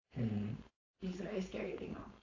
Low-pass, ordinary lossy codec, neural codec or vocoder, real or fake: 7.2 kHz; AAC, 32 kbps; codec, 16 kHz, 4.8 kbps, FACodec; fake